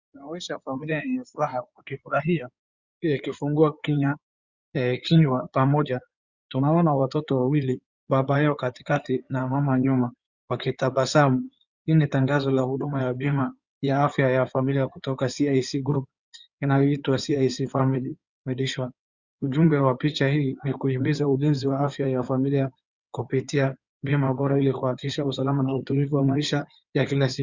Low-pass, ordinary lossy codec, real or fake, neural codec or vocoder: 7.2 kHz; Opus, 64 kbps; fake; codec, 16 kHz in and 24 kHz out, 2.2 kbps, FireRedTTS-2 codec